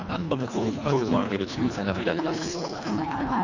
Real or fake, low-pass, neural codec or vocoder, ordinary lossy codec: fake; 7.2 kHz; codec, 24 kHz, 1.5 kbps, HILCodec; none